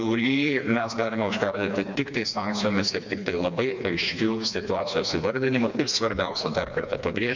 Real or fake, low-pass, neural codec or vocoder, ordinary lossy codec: fake; 7.2 kHz; codec, 16 kHz, 2 kbps, FreqCodec, smaller model; MP3, 64 kbps